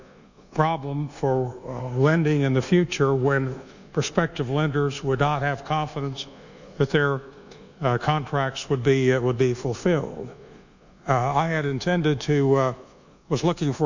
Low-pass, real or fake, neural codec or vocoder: 7.2 kHz; fake; codec, 24 kHz, 1.2 kbps, DualCodec